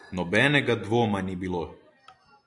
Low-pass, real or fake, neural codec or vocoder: 10.8 kHz; real; none